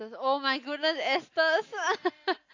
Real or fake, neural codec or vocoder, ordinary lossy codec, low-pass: real; none; AAC, 48 kbps; 7.2 kHz